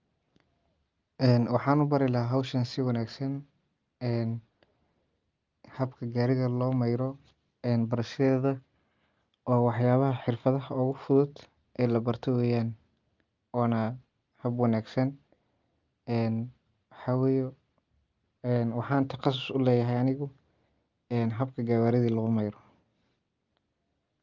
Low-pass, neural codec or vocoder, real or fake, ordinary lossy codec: 7.2 kHz; none; real; Opus, 24 kbps